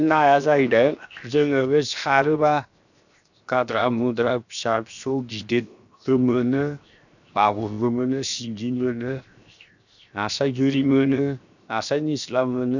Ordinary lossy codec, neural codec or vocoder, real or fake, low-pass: none; codec, 16 kHz, 0.7 kbps, FocalCodec; fake; 7.2 kHz